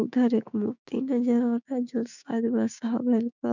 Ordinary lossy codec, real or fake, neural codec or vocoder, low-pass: none; fake; codec, 16 kHz, 6 kbps, DAC; 7.2 kHz